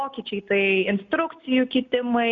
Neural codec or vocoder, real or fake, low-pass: none; real; 7.2 kHz